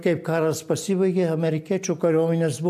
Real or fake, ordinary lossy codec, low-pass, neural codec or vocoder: real; AAC, 96 kbps; 14.4 kHz; none